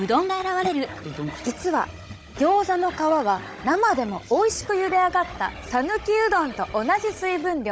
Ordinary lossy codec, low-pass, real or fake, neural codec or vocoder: none; none; fake; codec, 16 kHz, 16 kbps, FunCodec, trained on Chinese and English, 50 frames a second